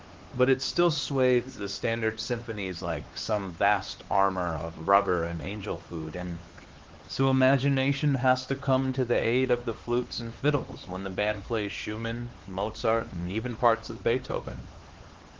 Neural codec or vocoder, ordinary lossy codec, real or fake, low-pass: codec, 16 kHz, 4 kbps, X-Codec, HuBERT features, trained on LibriSpeech; Opus, 16 kbps; fake; 7.2 kHz